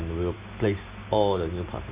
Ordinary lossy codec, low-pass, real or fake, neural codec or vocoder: Opus, 64 kbps; 3.6 kHz; real; none